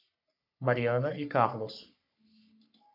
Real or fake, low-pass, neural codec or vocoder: fake; 5.4 kHz; codec, 44.1 kHz, 3.4 kbps, Pupu-Codec